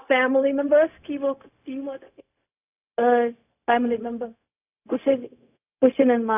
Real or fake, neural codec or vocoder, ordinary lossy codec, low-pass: fake; codec, 16 kHz, 0.4 kbps, LongCat-Audio-Codec; none; 3.6 kHz